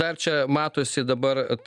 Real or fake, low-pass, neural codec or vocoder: real; 10.8 kHz; none